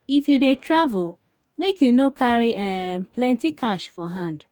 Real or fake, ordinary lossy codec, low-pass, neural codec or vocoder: fake; none; 19.8 kHz; codec, 44.1 kHz, 2.6 kbps, DAC